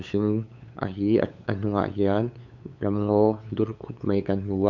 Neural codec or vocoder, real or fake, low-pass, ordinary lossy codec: codec, 16 kHz, 8 kbps, FunCodec, trained on LibriTTS, 25 frames a second; fake; 7.2 kHz; none